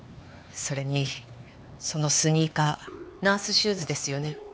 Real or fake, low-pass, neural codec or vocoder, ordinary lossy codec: fake; none; codec, 16 kHz, 4 kbps, X-Codec, HuBERT features, trained on LibriSpeech; none